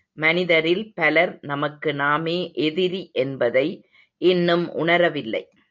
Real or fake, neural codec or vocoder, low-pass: real; none; 7.2 kHz